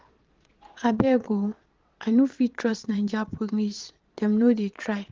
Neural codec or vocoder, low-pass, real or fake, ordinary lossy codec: vocoder, 22.05 kHz, 80 mel bands, Vocos; 7.2 kHz; fake; Opus, 16 kbps